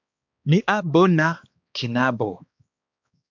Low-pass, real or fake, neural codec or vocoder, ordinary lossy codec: 7.2 kHz; fake; codec, 16 kHz, 2 kbps, X-Codec, HuBERT features, trained on balanced general audio; MP3, 64 kbps